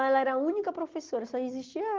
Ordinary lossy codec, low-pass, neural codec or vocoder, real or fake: Opus, 16 kbps; 7.2 kHz; none; real